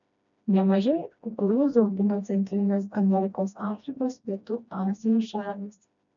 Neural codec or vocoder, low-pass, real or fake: codec, 16 kHz, 1 kbps, FreqCodec, smaller model; 7.2 kHz; fake